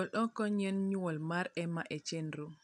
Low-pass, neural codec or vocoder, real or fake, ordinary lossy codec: 10.8 kHz; none; real; none